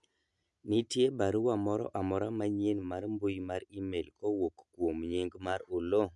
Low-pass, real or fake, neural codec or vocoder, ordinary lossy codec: 10.8 kHz; real; none; MP3, 96 kbps